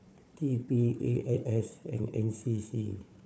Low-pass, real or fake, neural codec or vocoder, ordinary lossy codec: none; fake; codec, 16 kHz, 4 kbps, FunCodec, trained on Chinese and English, 50 frames a second; none